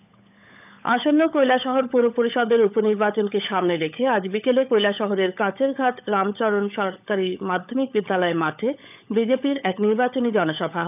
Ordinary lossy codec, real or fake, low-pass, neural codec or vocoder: none; fake; 3.6 kHz; codec, 16 kHz, 16 kbps, FunCodec, trained on LibriTTS, 50 frames a second